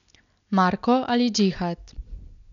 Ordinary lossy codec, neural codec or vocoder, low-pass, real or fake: none; none; 7.2 kHz; real